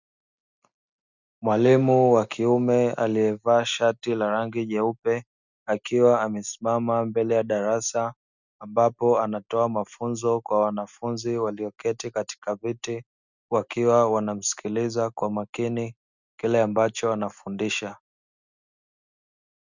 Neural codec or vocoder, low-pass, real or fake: none; 7.2 kHz; real